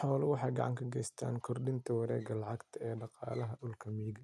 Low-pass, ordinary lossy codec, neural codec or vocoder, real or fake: none; none; none; real